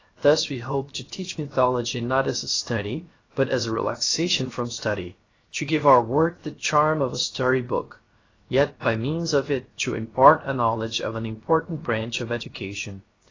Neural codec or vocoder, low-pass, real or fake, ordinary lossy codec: codec, 16 kHz, 0.7 kbps, FocalCodec; 7.2 kHz; fake; AAC, 32 kbps